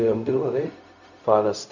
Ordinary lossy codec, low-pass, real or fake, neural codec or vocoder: none; 7.2 kHz; fake; codec, 16 kHz, 0.4 kbps, LongCat-Audio-Codec